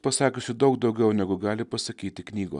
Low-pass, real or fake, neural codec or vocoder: 10.8 kHz; real; none